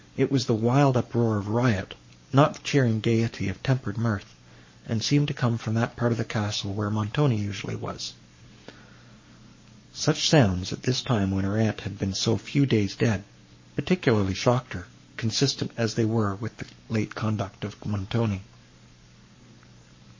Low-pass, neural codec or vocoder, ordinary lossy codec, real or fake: 7.2 kHz; codec, 44.1 kHz, 7.8 kbps, Pupu-Codec; MP3, 32 kbps; fake